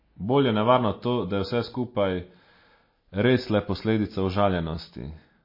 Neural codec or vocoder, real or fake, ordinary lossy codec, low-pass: none; real; MP3, 24 kbps; 5.4 kHz